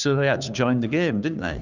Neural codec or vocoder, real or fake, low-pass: codec, 16 kHz, 2 kbps, FunCodec, trained on Chinese and English, 25 frames a second; fake; 7.2 kHz